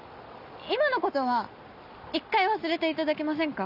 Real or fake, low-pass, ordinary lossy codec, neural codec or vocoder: real; 5.4 kHz; none; none